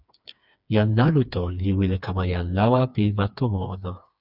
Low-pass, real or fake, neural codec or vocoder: 5.4 kHz; fake; codec, 16 kHz, 4 kbps, FreqCodec, smaller model